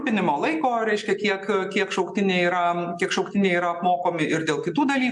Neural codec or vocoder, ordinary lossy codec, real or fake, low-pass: none; MP3, 96 kbps; real; 10.8 kHz